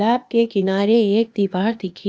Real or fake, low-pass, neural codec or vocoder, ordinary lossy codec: fake; none; codec, 16 kHz, 0.8 kbps, ZipCodec; none